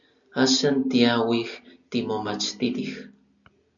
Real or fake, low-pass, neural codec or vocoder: real; 7.2 kHz; none